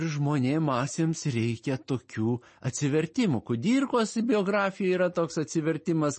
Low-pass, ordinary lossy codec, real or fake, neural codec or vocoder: 10.8 kHz; MP3, 32 kbps; real; none